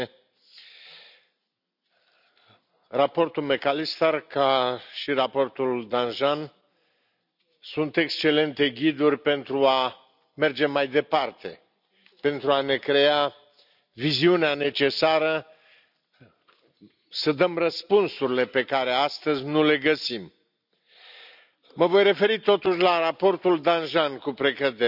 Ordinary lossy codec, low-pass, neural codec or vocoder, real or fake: none; 5.4 kHz; none; real